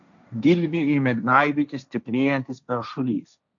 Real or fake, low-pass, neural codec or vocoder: fake; 7.2 kHz; codec, 16 kHz, 1.1 kbps, Voila-Tokenizer